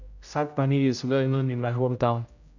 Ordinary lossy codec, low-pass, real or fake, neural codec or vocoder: none; 7.2 kHz; fake; codec, 16 kHz, 0.5 kbps, X-Codec, HuBERT features, trained on general audio